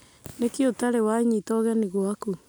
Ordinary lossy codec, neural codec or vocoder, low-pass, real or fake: none; none; none; real